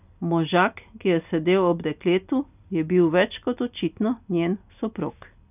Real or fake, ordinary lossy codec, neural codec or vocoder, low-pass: real; none; none; 3.6 kHz